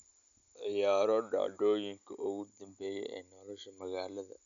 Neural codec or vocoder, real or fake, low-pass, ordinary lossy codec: none; real; 7.2 kHz; none